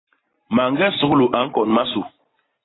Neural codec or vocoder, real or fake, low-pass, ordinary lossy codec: none; real; 7.2 kHz; AAC, 16 kbps